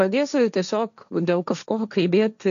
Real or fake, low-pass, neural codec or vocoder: fake; 7.2 kHz; codec, 16 kHz, 1.1 kbps, Voila-Tokenizer